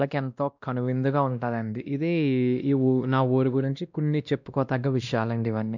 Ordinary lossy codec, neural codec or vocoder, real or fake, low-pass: none; codec, 16 kHz, 1 kbps, X-Codec, WavLM features, trained on Multilingual LibriSpeech; fake; 7.2 kHz